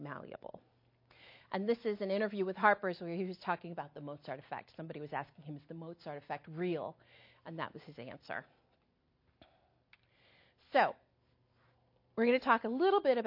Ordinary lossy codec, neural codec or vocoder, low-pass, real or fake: MP3, 32 kbps; none; 5.4 kHz; real